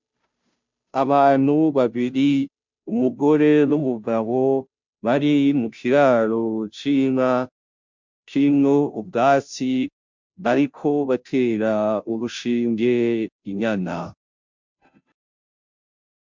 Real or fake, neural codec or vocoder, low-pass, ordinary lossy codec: fake; codec, 16 kHz, 0.5 kbps, FunCodec, trained on Chinese and English, 25 frames a second; 7.2 kHz; MP3, 64 kbps